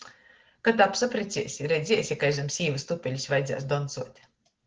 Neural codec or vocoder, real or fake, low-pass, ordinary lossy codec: none; real; 7.2 kHz; Opus, 16 kbps